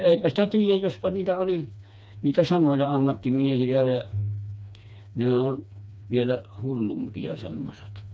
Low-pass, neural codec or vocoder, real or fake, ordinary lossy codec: none; codec, 16 kHz, 2 kbps, FreqCodec, smaller model; fake; none